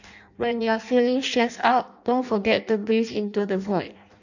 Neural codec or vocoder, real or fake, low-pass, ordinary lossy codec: codec, 16 kHz in and 24 kHz out, 0.6 kbps, FireRedTTS-2 codec; fake; 7.2 kHz; none